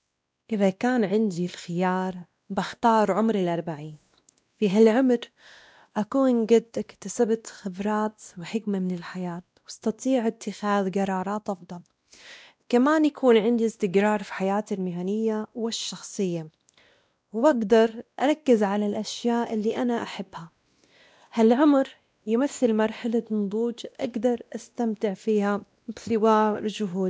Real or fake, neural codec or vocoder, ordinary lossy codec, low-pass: fake; codec, 16 kHz, 1 kbps, X-Codec, WavLM features, trained on Multilingual LibriSpeech; none; none